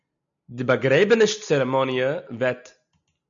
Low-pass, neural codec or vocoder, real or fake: 7.2 kHz; none; real